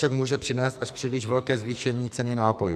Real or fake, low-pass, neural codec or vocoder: fake; 14.4 kHz; codec, 44.1 kHz, 2.6 kbps, SNAC